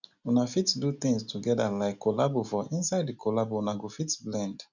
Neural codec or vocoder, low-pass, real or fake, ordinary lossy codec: none; 7.2 kHz; real; none